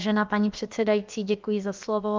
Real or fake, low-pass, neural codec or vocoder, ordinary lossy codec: fake; 7.2 kHz; codec, 16 kHz, about 1 kbps, DyCAST, with the encoder's durations; Opus, 24 kbps